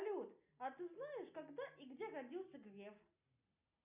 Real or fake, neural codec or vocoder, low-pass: real; none; 3.6 kHz